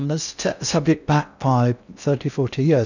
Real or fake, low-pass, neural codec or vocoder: fake; 7.2 kHz; codec, 16 kHz in and 24 kHz out, 0.6 kbps, FocalCodec, streaming, 4096 codes